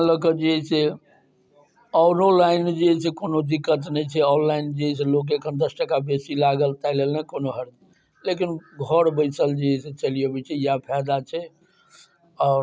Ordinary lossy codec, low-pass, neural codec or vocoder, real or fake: none; none; none; real